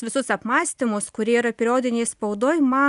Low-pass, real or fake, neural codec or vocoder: 10.8 kHz; real; none